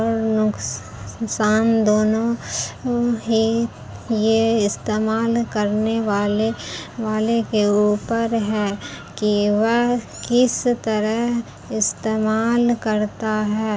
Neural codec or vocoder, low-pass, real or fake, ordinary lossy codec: none; none; real; none